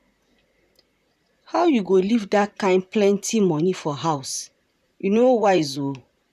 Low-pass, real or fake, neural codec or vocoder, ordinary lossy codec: 14.4 kHz; fake; vocoder, 44.1 kHz, 128 mel bands, Pupu-Vocoder; none